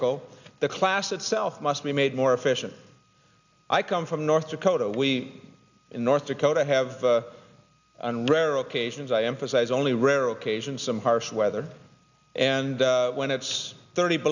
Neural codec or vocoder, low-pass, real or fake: none; 7.2 kHz; real